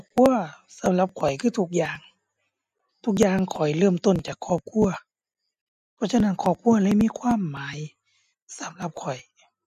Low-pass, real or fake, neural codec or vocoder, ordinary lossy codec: 14.4 kHz; real; none; MP3, 64 kbps